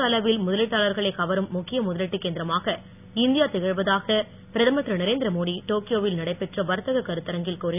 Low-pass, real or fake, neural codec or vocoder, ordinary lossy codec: 3.6 kHz; real; none; none